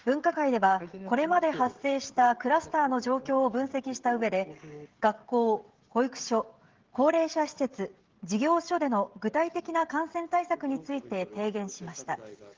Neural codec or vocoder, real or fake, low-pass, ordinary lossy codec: codec, 16 kHz, 16 kbps, FreqCodec, smaller model; fake; 7.2 kHz; Opus, 16 kbps